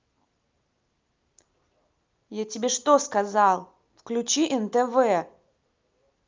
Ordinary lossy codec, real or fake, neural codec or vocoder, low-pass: Opus, 24 kbps; real; none; 7.2 kHz